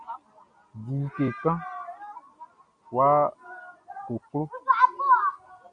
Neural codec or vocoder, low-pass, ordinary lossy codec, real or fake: none; 9.9 kHz; MP3, 48 kbps; real